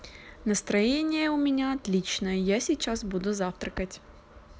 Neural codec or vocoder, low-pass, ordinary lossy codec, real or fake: none; none; none; real